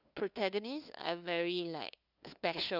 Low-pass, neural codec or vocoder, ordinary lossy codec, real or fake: 5.4 kHz; codec, 16 kHz, 2 kbps, FunCodec, trained on LibriTTS, 25 frames a second; none; fake